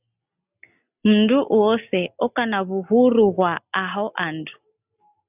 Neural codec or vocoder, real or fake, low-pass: none; real; 3.6 kHz